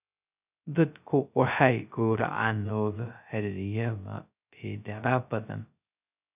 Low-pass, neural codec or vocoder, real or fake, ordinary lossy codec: 3.6 kHz; codec, 16 kHz, 0.2 kbps, FocalCodec; fake; none